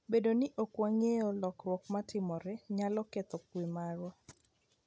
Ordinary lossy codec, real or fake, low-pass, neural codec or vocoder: none; real; none; none